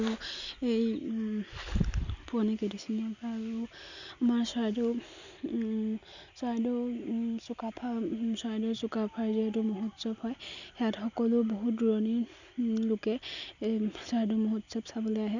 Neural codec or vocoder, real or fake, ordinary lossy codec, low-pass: none; real; none; 7.2 kHz